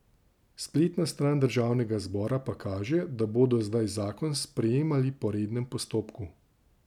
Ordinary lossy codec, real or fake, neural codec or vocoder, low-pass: none; real; none; 19.8 kHz